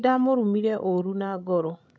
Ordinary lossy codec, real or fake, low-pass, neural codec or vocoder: none; fake; none; codec, 16 kHz, 16 kbps, FreqCodec, larger model